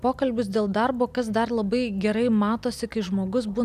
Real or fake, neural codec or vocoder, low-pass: real; none; 14.4 kHz